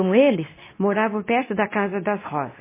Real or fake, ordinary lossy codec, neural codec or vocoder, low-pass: fake; MP3, 16 kbps; codec, 24 kHz, 0.9 kbps, WavTokenizer, medium speech release version 2; 3.6 kHz